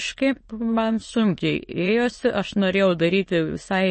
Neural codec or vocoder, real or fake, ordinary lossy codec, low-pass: autoencoder, 22.05 kHz, a latent of 192 numbers a frame, VITS, trained on many speakers; fake; MP3, 32 kbps; 9.9 kHz